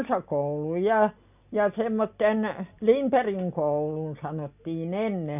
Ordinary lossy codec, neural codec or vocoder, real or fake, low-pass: none; none; real; 3.6 kHz